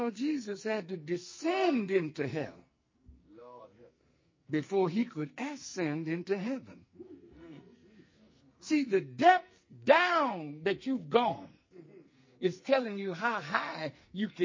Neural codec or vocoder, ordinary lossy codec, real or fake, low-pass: codec, 44.1 kHz, 2.6 kbps, SNAC; MP3, 32 kbps; fake; 7.2 kHz